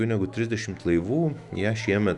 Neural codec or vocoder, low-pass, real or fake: autoencoder, 48 kHz, 128 numbers a frame, DAC-VAE, trained on Japanese speech; 10.8 kHz; fake